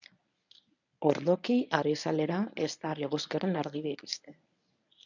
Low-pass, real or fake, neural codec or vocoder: 7.2 kHz; fake; codec, 24 kHz, 0.9 kbps, WavTokenizer, medium speech release version 1